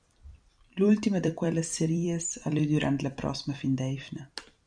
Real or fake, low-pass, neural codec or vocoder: fake; 9.9 kHz; vocoder, 44.1 kHz, 128 mel bands every 256 samples, BigVGAN v2